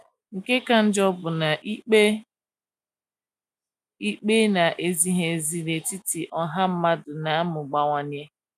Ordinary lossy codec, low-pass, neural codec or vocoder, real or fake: none; 14.4 kHz; none; real